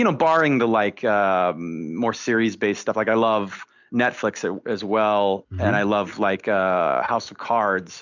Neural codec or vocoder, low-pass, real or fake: none; 7.2 kHz; real